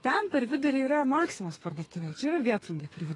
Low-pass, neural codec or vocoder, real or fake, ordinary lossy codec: 10.8 kHz; codec, 32 kHz, 1.9 kbps, SNAC; fake; AAC, 32 kbps